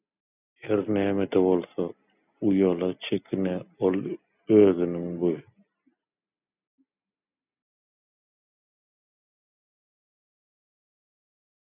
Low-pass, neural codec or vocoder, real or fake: 3.6 kHz; none; real